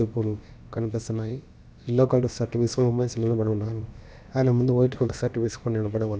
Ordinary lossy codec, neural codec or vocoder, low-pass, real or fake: none; codec, 16 kHz, about 1 kbps, DyCAST, with the encoder's durations; none; fake